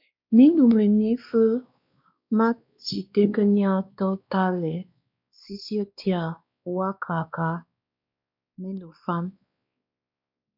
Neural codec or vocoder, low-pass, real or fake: codec, 16 kHz, 2 kbps, X-Codec, WavLM features, trained on Multilingual LibriSpeech; 5.4 kHz; fake